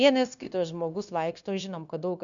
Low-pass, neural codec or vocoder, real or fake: 7.2 kHz; codec, 16 kHz, 0.9 kbps, LongCat-Audio-Codec; fake